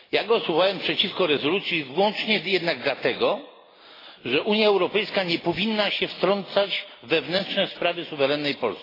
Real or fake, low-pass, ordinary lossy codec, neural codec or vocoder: real; 5.4 kHz; AAC, 24 kbps; none